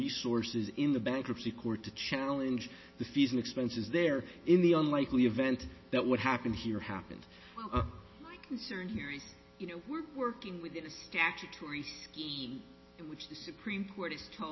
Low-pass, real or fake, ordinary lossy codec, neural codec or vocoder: 7.2 kHz; real; MP3, 24 kbps; none